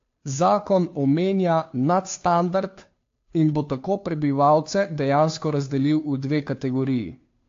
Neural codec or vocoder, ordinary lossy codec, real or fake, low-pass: codec, 16 kHz, 2 kbps, FunCodec, trained on Chinese and English, 25 frames a second; AAC, 48 kbps; fake; 7.2 kHz